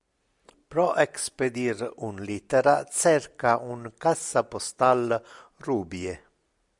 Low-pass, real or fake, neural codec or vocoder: 10.8 kHz; real; none